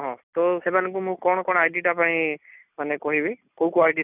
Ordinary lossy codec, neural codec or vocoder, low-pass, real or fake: none; none; 3.6 kHz; real